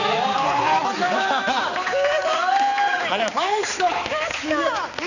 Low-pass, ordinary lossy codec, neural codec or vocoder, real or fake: 7.2 kHz; none; codec, 16 kHz, 4 kbps, X-Codec, HuBERT features, trained on balanced general audio; fake